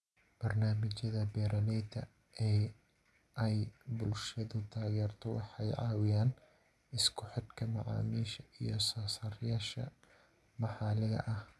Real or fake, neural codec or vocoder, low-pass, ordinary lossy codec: real; none; none; none